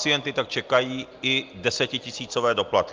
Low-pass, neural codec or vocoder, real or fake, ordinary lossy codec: 7.2 kHz; none; real; Opus, 32 kbps